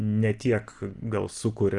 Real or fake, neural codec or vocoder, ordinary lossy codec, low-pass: real; none; Opus, 24 kbps; 10.8 kHz